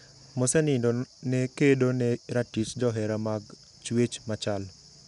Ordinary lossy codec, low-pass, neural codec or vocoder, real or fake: none; 10.8 kHz; none; real